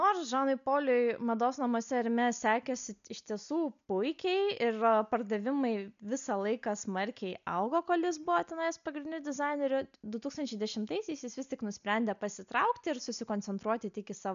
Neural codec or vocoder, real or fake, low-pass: none; real; 7.2 kHz